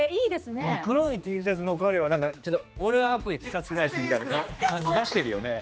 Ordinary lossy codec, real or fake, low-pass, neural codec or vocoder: none; fake; none; codec, 16 kHz, 2 kbps, X-Codec, HuBERT features, trained on general audio